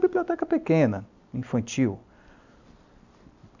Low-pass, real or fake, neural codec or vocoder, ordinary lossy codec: 7.2 kHz; real; none; none